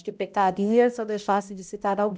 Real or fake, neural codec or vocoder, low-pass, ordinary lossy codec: fake; codec, 16 kHz, 0.5 kbps, X-Codec, HuBERT features, trained on balanced general audio; none; none